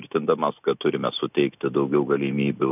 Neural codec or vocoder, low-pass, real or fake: none; 3.6 kHz; real